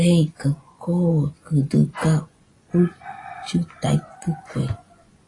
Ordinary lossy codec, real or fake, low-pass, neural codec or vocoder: AAC, 32 kbps; fake; 10.8 kHz; vocoder, 24 kHz, 100 mel bands, Vocos